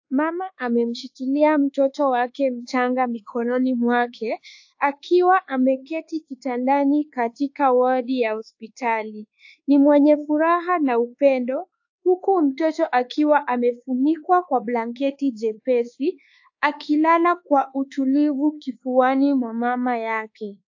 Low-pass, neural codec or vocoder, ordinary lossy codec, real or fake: 7.2 kHz; codec, 24 kHz, 1.2 kbps, DualCodec; AAC, 48 kbps; fake